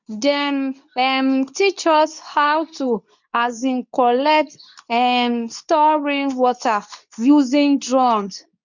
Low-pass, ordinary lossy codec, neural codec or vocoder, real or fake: 7.2 kHz; none; codec, 24 kHz, 0.9 kbps, WavTokenizer, medium speech release version 2; fake